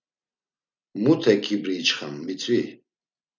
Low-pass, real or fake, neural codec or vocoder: 7.2 kHz; real; none